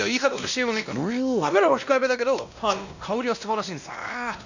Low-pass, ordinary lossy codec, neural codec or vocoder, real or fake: 7.2 kHz; none; codec, 16 kHz, 1 kbps, X-Codec, WavLM features, trained on Multilingual LibriSpeech; fake